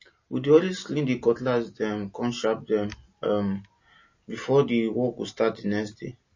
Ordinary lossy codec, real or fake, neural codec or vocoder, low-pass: MP3, 32 kbps; real; none; 7.2 kHz